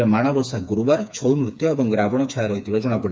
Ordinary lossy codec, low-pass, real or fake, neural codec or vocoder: none; none; fake; codec, 16 kHz, 4 kbps, FreqCodec, smaller model